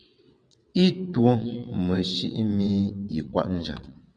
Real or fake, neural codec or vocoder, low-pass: fake; vocoder, 22.05 kHz, 80 mel bands, WaveNeXt; 9.9 kHz